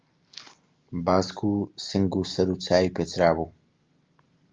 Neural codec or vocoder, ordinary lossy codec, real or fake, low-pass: none; Opus, 32 kbps; real; 7.2 kHz